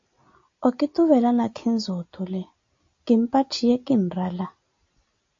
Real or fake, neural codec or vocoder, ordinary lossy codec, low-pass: real; none; AAC, 48 kbps; 7.2 kHz